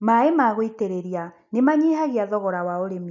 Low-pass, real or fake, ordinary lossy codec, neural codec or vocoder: 7.2 kHz; real; none; none